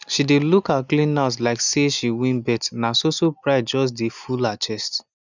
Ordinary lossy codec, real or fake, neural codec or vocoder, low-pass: none; real; none; 7.2 kHz